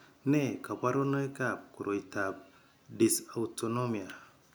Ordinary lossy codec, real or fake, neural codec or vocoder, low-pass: none; real; none; none